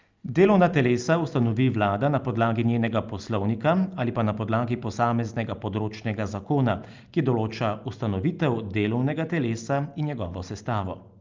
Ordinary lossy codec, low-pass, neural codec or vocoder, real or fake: Opus, 32 kbps; 7.2 kHz; none; real